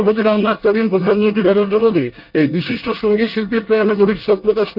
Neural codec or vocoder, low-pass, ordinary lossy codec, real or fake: codec, 24 kHz, 1 kbps, SNAC; 5.4 kHz; Opus, 24 kbps; fake